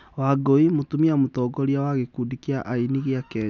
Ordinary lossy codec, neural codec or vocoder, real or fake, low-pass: none; none; real; 7.2 kHz